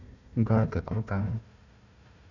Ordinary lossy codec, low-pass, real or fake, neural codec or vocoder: none; 7.2 kHz; fake; codec, 16 kHz, 1 kbps, FunCodec, trained on Chinese and English, 50 frames a second